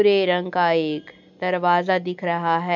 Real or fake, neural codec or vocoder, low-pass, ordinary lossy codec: real; none; 7.2 kHz; none